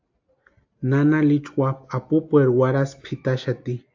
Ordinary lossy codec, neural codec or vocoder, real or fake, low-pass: AAC, 48 kbps; none; real; 7.2 kHz